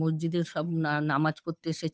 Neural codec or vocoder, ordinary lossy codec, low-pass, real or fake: codec, 16 kHz, 8 kbps, FunCodec, trained on Chinese and English, 25 frames a second; none; none; fake